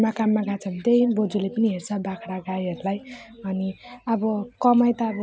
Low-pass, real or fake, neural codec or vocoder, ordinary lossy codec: none; real; none; none